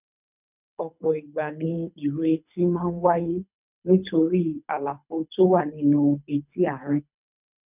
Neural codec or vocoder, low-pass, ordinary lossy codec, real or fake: codec, 24 kHz, 3 kbps, HILCodec; 3.6 kHz; none; fake